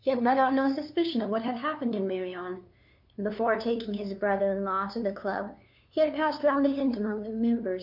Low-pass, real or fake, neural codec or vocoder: 5.4 kHz; fake; codec, 16 kHz, 2 kbps, FunCodec, trained on LibriTTS, 25 frames a second